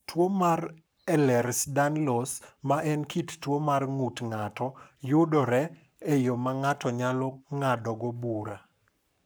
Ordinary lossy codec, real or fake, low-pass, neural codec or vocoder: none; fake; none; codec, 44.1 kHz, 7.8 kbps, Pupu-Codec